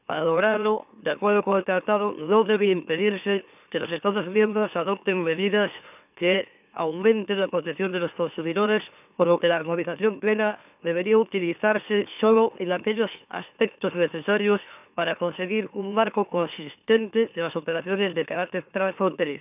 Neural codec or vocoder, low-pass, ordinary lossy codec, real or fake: autoencoder, 44.1 kHz, a latent of 192 numbers a frame, MeloTTS; 3.6 kHz; none; fake